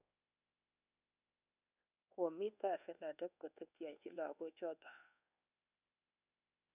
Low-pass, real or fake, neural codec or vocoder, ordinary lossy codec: 3.6 kHz; fake; codec, 24 kHz, 1.2 kbps, DualCodec; none